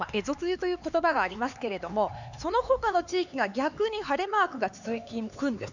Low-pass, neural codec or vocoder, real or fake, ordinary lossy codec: 7.2 kHz; codec, 16 kHz, 4 kbps, X-Codec, HuBERT features, trained on LibriSpeech; fake; none